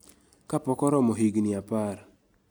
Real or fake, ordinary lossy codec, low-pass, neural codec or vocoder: real; none; none; none